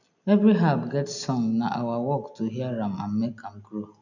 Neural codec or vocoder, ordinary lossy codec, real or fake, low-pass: none; none; real; 7.2 kHz